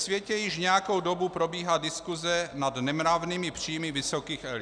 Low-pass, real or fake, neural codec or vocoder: 9.9 kHz; real; none